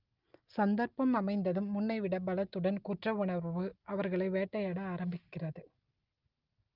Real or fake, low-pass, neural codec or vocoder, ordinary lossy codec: real; 5.4 kHz; none; Opus, 24 kbps